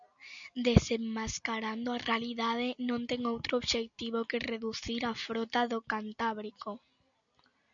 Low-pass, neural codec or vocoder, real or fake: 7.2 kHz; none; real